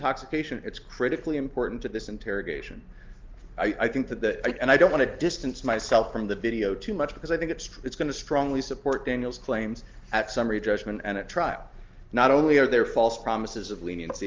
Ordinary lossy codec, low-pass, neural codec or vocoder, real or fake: Opus, 32 kbps; 7.2 kHz; none; real